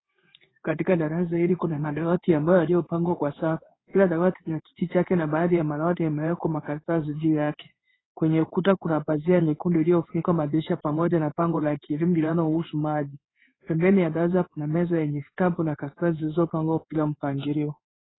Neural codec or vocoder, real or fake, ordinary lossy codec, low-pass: codec, 16 kHz in and 24 kHz out, 1 kbps, XY-Tokenizer; fake; AAC, 16 kbps; 7.2 kHz